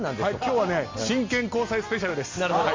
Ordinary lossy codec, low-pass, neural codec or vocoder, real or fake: MP3, 48 kbps; 7.2 kHz; none; real